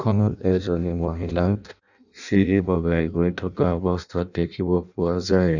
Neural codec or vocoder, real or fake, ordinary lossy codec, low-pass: codec, 16 kHz in and 24 kHz out, 0.6 kbps, FireRedTTS-2 codec; fake; none; 7.2 kHz